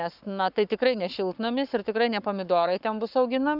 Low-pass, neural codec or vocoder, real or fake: 5.4 kHz; codec, 44.1 kHz, 7.8 kbps, Pupu-Codec; fake